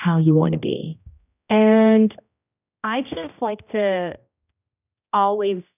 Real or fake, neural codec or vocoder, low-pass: fake; codec, 16 kHz, 1 kbps, X-Codec, HuBERT features, trained on general audio; 3.6 kHz